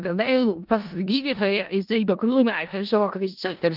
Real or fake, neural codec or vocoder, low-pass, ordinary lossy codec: fake; codec, 16 kHz in and 24 kHz out, 0.4 kbps, LongCat-Audio-Codec, four codebook decoder; 5.4 kHz; Opus, 32 kbps